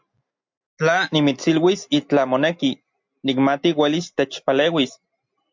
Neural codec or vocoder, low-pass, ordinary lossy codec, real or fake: none; 7.2 kHz; MP3, 64 kbps; real